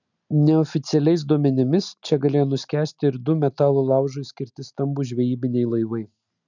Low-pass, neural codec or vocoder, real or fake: 7.2 kHz; none; real